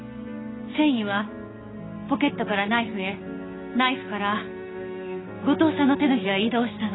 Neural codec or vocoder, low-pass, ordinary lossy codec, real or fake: codec, 16 kHz, 6 kbps, DAC; 7.2 kHz; AAC, 16 kbps; fake